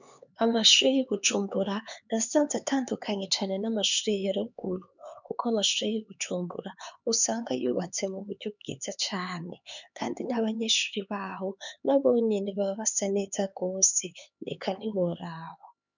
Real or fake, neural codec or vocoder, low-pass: fake; codec, 16 kHz, 4 kbps, X-Codec, HuBERT features, trained on LibriSpeech; 7.2 kHz